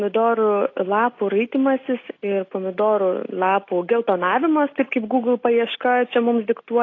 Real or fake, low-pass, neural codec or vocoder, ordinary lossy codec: real; 7.2 kHz; none; AAC, 32 kbps